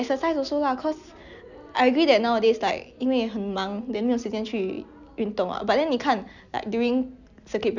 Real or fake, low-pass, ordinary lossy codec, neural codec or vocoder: real; 7.2 kHz; none; none